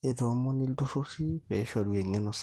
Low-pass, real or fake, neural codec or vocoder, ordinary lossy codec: 19.8 kHz; fake; autoencoder, 48 kHz, 128 numbers a frame, DAC-VAE, trained on Japanese speech; Opus, 16 kbps